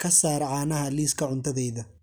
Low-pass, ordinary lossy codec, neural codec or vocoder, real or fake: none; none; none; real